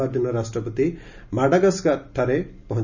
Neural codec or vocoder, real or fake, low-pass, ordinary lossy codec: none; real; 7.2 kHz; none